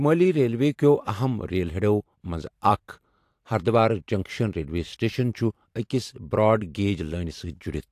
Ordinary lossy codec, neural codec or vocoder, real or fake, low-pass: AAC, 64 kbps; vocoder, 44.1 kHz, 128 mel bands every 512 samples, BigVGAN v2; fake; 14.4 kHz